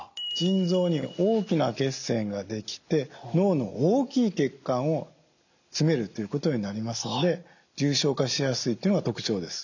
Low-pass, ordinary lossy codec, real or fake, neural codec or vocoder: 7.2 kHz; none; real; none